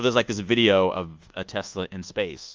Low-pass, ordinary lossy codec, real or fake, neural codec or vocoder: 7.2 kHz; Opus, 32 kbps; fake; codec, 24 kHz, 1.2 kbps, DualCodec